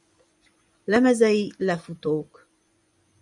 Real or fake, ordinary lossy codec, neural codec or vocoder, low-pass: fake; MP3, 96 kbps; vocoder, 24 kHz, 100 mel bands, Vocos; 10.8 kHz